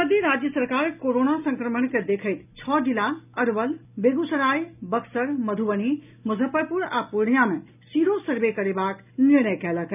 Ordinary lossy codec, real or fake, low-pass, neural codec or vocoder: none; real; 3.6 kHz; none